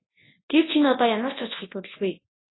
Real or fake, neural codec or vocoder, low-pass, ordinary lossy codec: fake; codec, 24 kHz, 0.9 kbps, WavTokenizer, large speech release; 7.2 kHz; AAC, 16 kbps